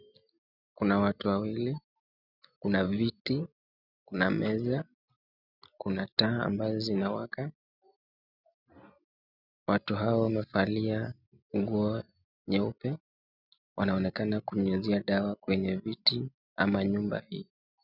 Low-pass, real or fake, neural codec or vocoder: 5.4 kHz; real; none